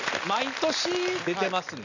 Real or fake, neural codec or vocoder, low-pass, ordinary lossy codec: real; none; 7.2 kHz; none